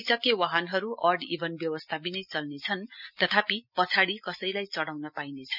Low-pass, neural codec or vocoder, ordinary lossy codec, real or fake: 5.4 kHz; none; none; real